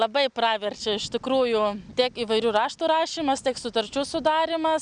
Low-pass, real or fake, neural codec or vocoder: 9.9 kHz; real; none